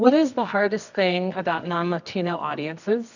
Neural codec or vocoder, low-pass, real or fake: codec, 24 kHz, 0.9 kbps, WavTokenizer, medium music audio release; 7.2 kHz; fake